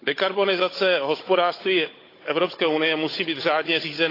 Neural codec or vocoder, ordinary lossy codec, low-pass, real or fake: codec, 16 kHz, 16 kbps, FunCodec, trained on Chinese and English, 50 frames a second; AAC, 32 kbps; 5.4 kHz; fake